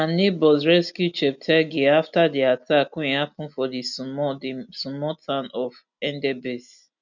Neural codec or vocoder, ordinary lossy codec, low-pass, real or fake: none; none; 7.2 kHz; real